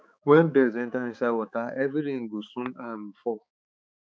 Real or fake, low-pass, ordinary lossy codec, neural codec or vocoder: fake; none; none; codec, 16 kHz, 4 kbps, X-Codec, HuBERT features, trained on balanced general audio